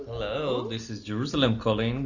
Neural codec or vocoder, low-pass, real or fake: none; 7.2 kHz; real